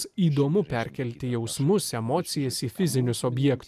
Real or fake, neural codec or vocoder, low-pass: real; none; 14.4 kHz